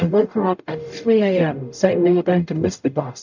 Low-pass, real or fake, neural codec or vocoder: 7.2 kHz; fake; codec, 44.1 kHz, 0.9 kbps, DAC